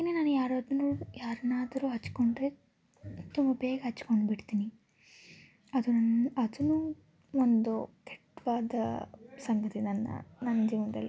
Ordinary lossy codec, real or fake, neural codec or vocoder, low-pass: none; real; none; none